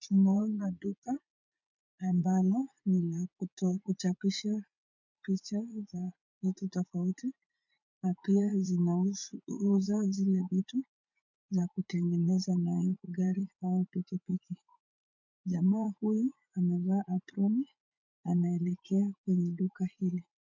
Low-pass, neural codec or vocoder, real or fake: 7.2 kHz; vocoder, 44.1 kHz, 80 mel bands, Vocos; fake